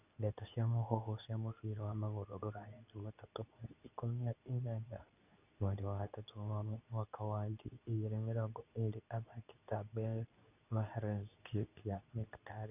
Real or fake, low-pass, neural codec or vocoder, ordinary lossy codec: fake; 3.6 kHz; codec, 24 kHz, 0.9 kbps, WavTokenizer, medium speech release version 2; none